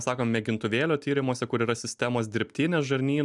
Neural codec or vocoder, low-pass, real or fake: none; 10.8 kHz; real